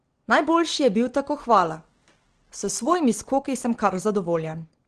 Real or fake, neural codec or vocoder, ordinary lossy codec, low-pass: real; none; Opus, 16 kbps; 9.9 kHz